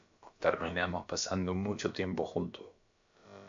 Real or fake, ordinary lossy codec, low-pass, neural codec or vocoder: fake; AAC, 64 kbps; 7.2 kHz; codec, 16 kHz, about 1 kbps, DyCAST, with the encoder's durations